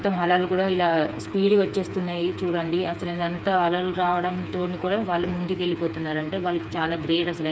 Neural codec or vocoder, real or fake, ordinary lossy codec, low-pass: codec, 16 kHz, 4 kbps, FreqCodec, smaller model; fake; none; none